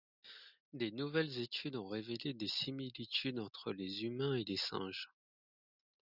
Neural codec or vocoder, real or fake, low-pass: none; real; 5.4 kHz